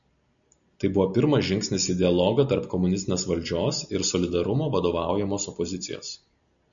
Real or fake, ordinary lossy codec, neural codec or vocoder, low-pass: real; MP3, 96 kbps; none; 7.2 kHz